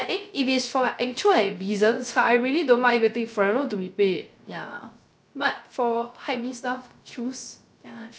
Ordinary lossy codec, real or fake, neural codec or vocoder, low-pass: none; fake; codec, 16 kHz, 0.3 kbps, FocalCodec; none